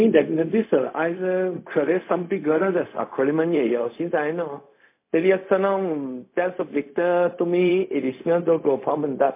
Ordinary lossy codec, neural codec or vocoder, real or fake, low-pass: MP3, 24 kbps; codec, 16 kHz, 0.4 kbps, LongCat-Audio-Codec; fake; 3.6 kHz